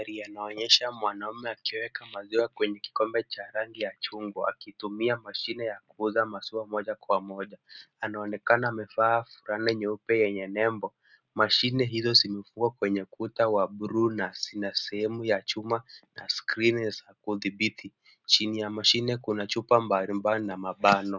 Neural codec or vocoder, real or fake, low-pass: none; real; 7.2 kHz